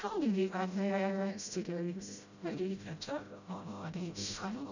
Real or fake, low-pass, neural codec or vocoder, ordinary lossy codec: fake; 7.2 kHz; codec, 16 kHz, 0.5 kbps, FreqCodec, smaller model; none